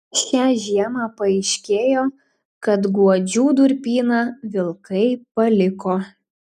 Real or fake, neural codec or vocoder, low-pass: real; none; 14.4 kHz